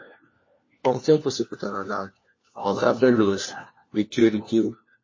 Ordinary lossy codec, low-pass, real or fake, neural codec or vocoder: MP3, 32 kbps; 7.2 kHz; fake; codec, 16 kHz, 1 kbps, FunCodec, trained on LibriTTS, 50 frames a second